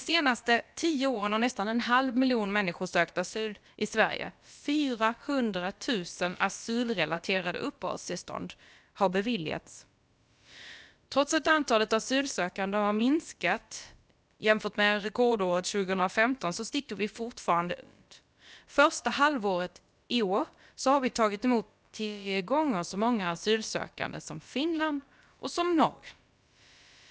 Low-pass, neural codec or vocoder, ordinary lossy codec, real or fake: none; codec, 16 kHz, about 1 kbps, DyCAST, with the encoder's durations; none; fake